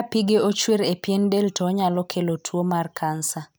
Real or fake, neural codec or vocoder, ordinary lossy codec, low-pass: real; none; none; none